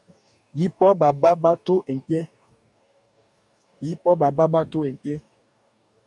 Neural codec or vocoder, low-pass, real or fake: codec, 44.1 kHz, 2.6 kbps, DAC; 10.8 kHz; fake